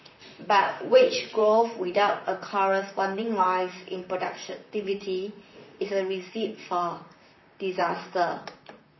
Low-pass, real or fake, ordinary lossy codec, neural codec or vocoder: 7.2 kHz; fake; MP3, 24 kbps; vocoder, 44.1 kHz, 128 mel bands, Pupu-Vocoder